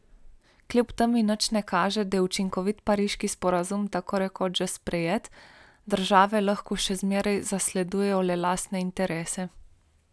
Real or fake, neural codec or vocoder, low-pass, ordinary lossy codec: real; none; none; none